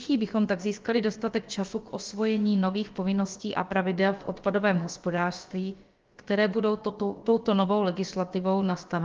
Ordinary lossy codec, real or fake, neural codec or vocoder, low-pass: Opus, 32 kbps; fake; codec, 16 kHz, about 1 kbps, DyCAST, with the encoder's durations; 7.2 kHz